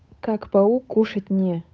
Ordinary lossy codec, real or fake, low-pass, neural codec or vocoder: none; fake; none; codec, 16 kHz, 8 kbps, FunCodec, trained on Chinese and English, 25 frames a second